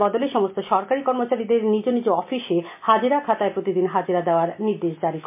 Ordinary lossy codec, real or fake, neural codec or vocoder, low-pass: MP3, 24 kbps; real; none; 3.6 kHz